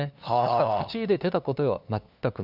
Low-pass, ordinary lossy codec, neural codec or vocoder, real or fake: 5.4 kHz; Opus, 64 kbps; codec, 16 kHz, 2 kbps, FunCodec, trained on LibriTTS, 25 frames a second; fake